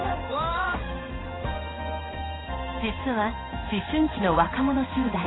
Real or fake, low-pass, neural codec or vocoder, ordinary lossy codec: fake; 7.2 kHz; codec, 16 kHz in and 24 kHz out, 1 kbps, XY-Tokenizer; AAC, 16 kbps